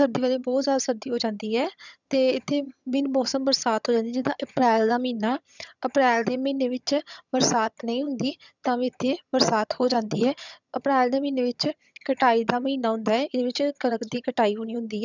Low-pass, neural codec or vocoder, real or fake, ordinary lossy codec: 7.2 kHz; vocoder, 22.05 kHz, 80 mel bands, HiFi-GAN; fake; none